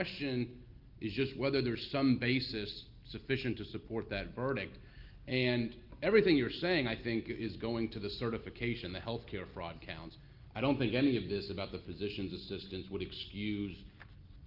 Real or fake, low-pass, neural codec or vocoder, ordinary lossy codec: real; 5.4 kHz; none; Opus, 32 kbps